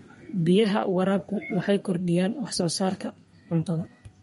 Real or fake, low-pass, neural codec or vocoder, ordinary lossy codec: fake; 14.4 kHz; codec, 32 kHz, 1.9 kbps, SNAC; MP3, 48 kbps